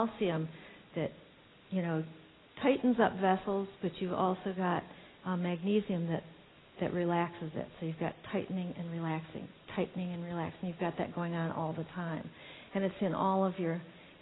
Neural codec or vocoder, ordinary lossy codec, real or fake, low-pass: none; AAC, 16 kbps; real; 7.2 kHz